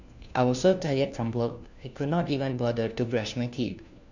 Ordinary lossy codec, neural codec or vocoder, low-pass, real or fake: none; codec, 16 kHz, 1 kbps, FunCodec, trained on LibriTTS, 50 frames a second; 7.2 kHz; fake